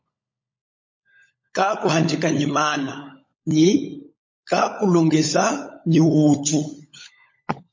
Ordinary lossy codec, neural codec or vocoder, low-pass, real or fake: MP3, 32 kbps; codec, 16 kHz, 16 kbps, FunCodec, trained on LibriTTS, 50 frames a second; 7.2 kHz; fake